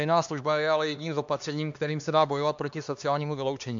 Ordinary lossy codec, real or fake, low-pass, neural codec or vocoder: AAC, 64 kbps; fake; 7.2 kHz; codec, 16 kHz, 2 kbps, X-Codec, HuBERT features, trained on LibriSpeech